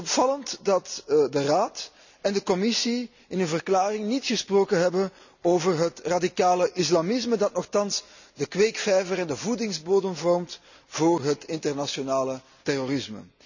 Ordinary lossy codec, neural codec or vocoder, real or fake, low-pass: none; none; real; 7.2 kHz